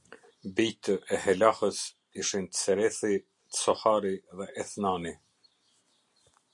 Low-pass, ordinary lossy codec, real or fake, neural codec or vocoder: 10.8 kHz; MP3, 48 kbps; real; none